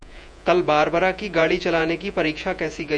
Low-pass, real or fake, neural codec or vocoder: 9.9 kHz; fake; vocoder, 48 kHz, 128 mel bands, Vocos